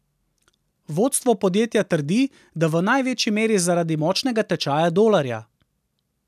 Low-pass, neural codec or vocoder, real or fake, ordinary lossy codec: 14.4 kHz; none; real; none